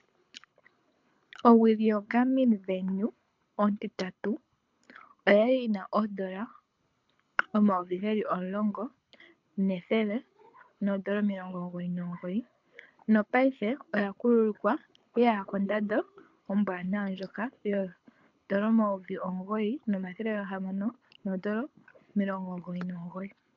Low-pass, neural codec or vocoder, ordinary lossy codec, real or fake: 7.2 kHz; codec, 24 kHz, 6 kbps, HILCodec; MP3, 64 kbps; fake